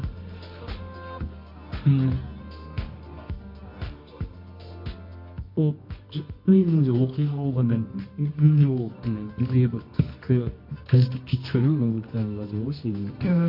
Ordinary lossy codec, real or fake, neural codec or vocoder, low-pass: MP3, 32 kbps; fake; codec, 24 kHz, 0.9 kbps, WavTokenizer, medium music audio release; 5.4 kHz